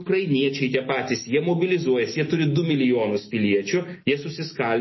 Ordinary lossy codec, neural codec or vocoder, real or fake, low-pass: MP3, 24 kbps; none; real; 7.2 kHz